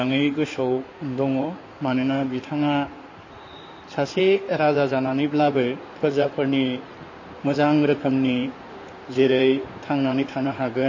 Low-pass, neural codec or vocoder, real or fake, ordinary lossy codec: 7.2 kHz; codec, 16 kHz in and 24 kHz out, 2.2 kbps, FireRedTTS-2 codec; fake; MP3, 32 kbps